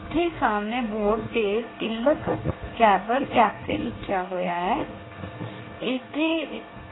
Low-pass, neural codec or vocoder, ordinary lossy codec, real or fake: 7.2 kHz; codec, 24 kHz, 1 kbps, SNAC; AAC, 16 kbps; fake